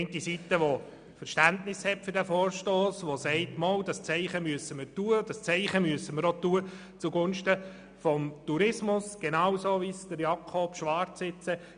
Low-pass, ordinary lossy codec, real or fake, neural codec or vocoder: 9.9 kHz; none; real; none